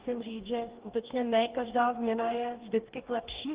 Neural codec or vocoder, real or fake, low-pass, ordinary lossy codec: codec, 44.1 kHz, 2.6 kbps, DAC; fake; 3.6 kHz; Opus, 16 kbps